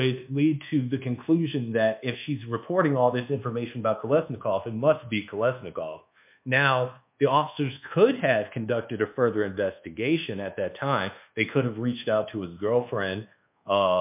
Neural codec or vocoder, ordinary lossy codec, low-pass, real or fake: codec, 24 kHz, 1.2 kbps, DualCodec; MP3, 32 kbps; 3.6 kHz; fake